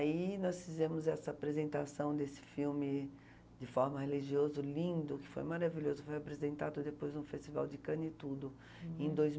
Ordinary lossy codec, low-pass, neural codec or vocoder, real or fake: none; none; none; real